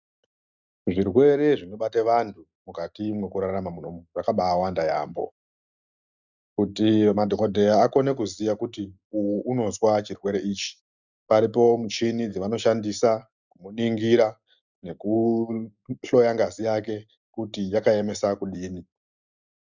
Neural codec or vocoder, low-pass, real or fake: none; 7.2 kHz; real